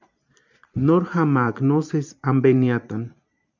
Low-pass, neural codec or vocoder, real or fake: 7.2 kHz; vocoder, 44.1 kHz, 128 mel bands every 512 samples, BigVGAN v2; fake